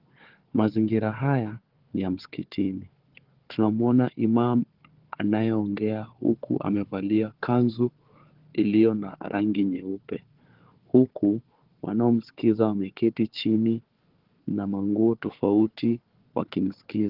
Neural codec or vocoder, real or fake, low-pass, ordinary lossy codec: codec, 16 kHz, 4 kbps, FunCodec, trained on Chinese and English, 50 frames a second; fake; 5.4 kHz; Opus, 16 kbps